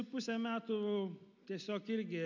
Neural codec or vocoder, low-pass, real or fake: none; 7.2 kHz; real